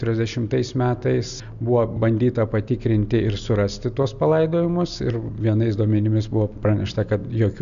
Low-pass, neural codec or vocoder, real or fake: 7.2 kHz; none; real